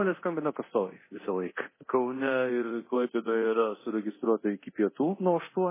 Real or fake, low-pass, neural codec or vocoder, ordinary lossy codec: fake; 3.6 kHz; codec, 24 kHz, 0.9 kbps, DualCodec; MP3, 16 kbps